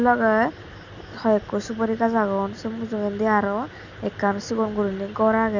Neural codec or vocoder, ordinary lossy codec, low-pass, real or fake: none; none; 7.2 kHz; real